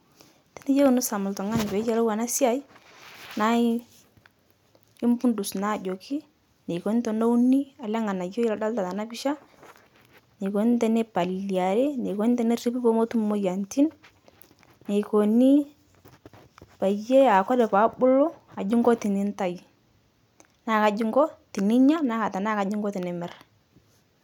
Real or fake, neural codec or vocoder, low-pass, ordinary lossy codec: real; none; 19.8 kHz; none